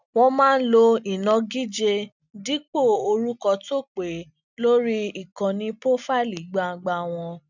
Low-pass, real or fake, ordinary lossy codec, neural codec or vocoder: 7.2 kHz; real; none; none